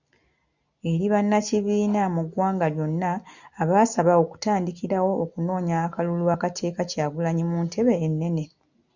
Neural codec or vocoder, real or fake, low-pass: none; real; 7.2 kHz